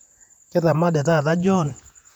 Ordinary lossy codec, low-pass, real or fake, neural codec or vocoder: none; 19.8 kHz; fake; vocoder, 48 kHz, 128 mel bands, Vocos